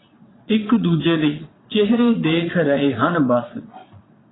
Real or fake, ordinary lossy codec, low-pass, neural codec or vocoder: fake; AAC, 16 kbps; 7.2 kHz; vocoder, 22.05 kHz, 80 mel bands, Vocos